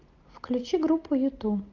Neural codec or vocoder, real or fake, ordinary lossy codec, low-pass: none; real; Opus, 24 kbps; 7.2 kHz